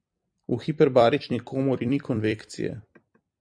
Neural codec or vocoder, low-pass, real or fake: vocoder, 44.1 kHz, 128 mel bands every 256 samples, BigVGAN v2; 9.9 kHz; fake